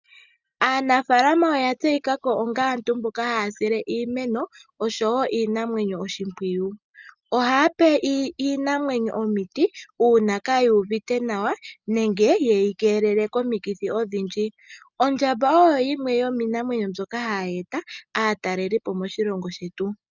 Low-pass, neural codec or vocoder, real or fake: 7.2 kHz; none; real